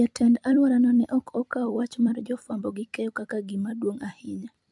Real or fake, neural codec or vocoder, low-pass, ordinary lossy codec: fake; vocoder, 44.1 kHz, 128 mel bands every 256 samples, BigVGAN v2; 10.8 kHz; none